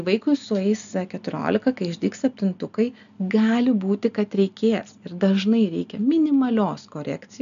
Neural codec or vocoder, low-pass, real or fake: none; 7.2 kHz; real